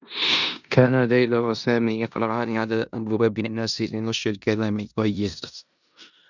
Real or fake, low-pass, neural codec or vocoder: fake; 7.2 kHz; codec, 16 kHz in and 24 kHz out, 0.9 kbps, LongCat-Audio-Codec, four codebook decoder